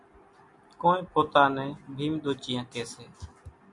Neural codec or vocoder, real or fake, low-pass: none; real; 10.8 kHz